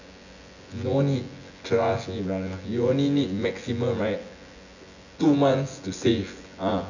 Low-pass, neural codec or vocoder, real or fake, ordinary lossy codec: 7.2 kHz; vocoder, 24 kHz, 100 mel bands, Vocos; fake; none